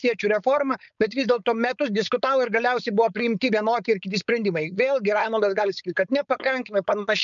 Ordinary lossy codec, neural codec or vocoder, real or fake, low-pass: MP3, 96 kbps; codec, 16 kHz, 4.8 kbps, FACodec; fake; 7.2 kHz